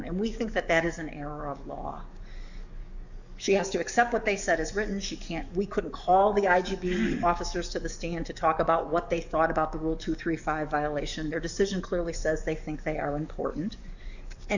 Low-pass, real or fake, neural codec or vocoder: 7.2 kHz; fake; codec, 44.1 kHz, 7.8 kbps, DAC